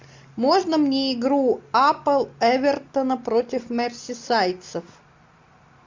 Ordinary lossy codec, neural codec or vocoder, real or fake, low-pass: MP3, 64 kbps; none; real; 7.2 kHz